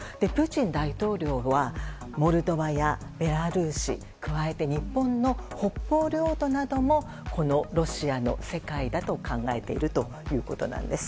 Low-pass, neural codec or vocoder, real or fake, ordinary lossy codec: none; none; real; none